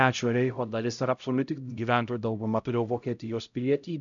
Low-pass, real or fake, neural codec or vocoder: 7.2 kHz; fake; codec, 16 kHz, 0.5 kbps, X-Codec, HuBERT features, trained on LibriSpeech